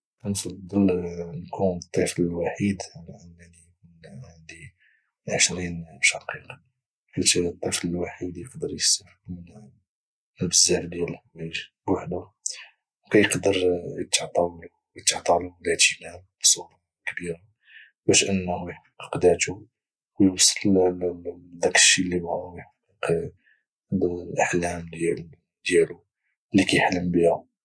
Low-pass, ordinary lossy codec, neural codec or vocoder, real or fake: none; none; none; real